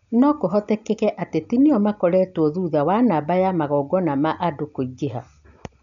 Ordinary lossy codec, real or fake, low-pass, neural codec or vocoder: none; real; 7.2 kHz; none